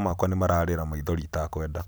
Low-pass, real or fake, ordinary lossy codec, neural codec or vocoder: none; real; none; none